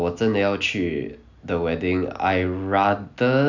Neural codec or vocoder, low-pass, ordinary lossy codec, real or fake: none; 7.2 kHz; none; real